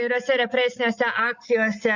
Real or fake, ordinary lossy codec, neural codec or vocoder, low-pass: real; Opus, 64 kbps; none; 7.2 kHz